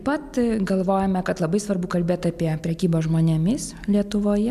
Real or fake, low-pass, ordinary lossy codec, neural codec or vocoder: real; 14.4 kHz; MP3, 96 kbps; none